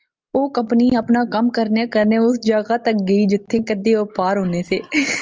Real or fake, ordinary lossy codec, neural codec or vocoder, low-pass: real; Opus, 24 kbps; none; 7.2 kHz